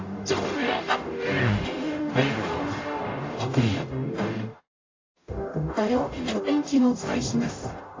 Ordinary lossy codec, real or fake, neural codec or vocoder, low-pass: AAC, 32 kbps; fake; codec, 44.1 kHz, 0.9 kbps, DAC; 7.2 kHz